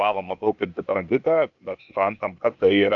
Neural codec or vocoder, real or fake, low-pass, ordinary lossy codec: codec, 16 kHz, 0.8 kbps, ZipCodec; fake; 7.2 kHz; AAC, 64 kbps